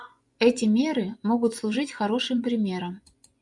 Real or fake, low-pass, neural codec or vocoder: fake; 10.8 kHz; vocoder, 24 kHz, 100 mel bands, Vocos